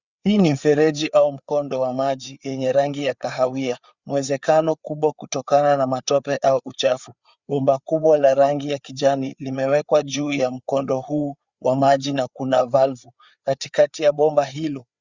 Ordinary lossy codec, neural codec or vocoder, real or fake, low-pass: Opus, 64 kbps; codec, 16 kHz, 4 kbps, FreqCodec, larger model; fake; 7.2 kHz